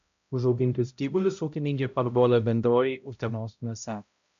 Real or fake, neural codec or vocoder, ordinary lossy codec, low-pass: fake; codec, 16 kHz, 0.5 kbps, X-Codec, HuBERT features, trained on balanced general audio; MP3, 64 kbps; 7.2 kHz